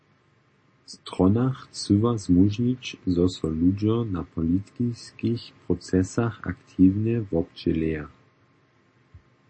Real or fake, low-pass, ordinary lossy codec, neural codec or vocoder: real; 9.9 kHz; MP3, 32 kbps; none